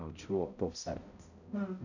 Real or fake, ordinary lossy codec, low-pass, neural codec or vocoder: fake; none; 7.2 kHz; codec, 16 kHz, 0.5 kbps, X-Codec, HuBERT features, trained on balanced general audio